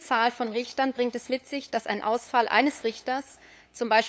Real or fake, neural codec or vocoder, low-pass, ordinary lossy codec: fake; codec, 16 kHz, 8 kbps, FunCodec, trained on LibriTTS, 25 frames a second; none; none